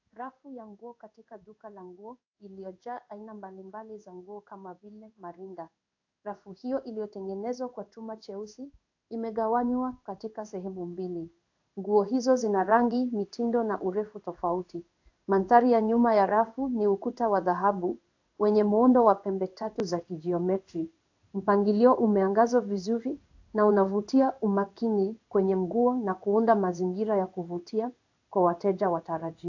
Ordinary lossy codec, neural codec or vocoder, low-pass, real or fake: AAC, 48 kbps; codec, 16 kHz in and 24 kHz out, 1 kbps, XY-Tokenizer; 7.2 kHz; fake